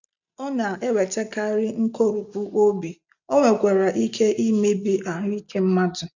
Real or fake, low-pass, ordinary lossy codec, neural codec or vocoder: real; 7.2 kHz; none; none